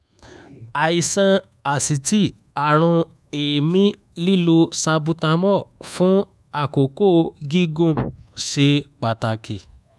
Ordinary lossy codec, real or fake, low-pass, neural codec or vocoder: none; fake; none; codec, 24 kHz, 1.2 kbps, DualCodec